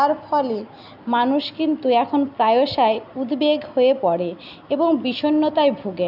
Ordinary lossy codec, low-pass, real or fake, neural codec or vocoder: none; 5.4 kHz; real; none